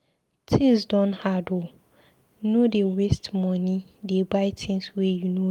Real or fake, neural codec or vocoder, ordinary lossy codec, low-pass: real; none; Opus, 32 kbps; 19.8 kHz